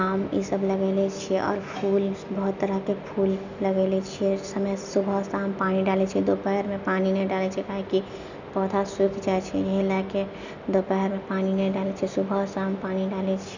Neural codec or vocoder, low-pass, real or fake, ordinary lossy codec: none; 7.2 kHz; real; none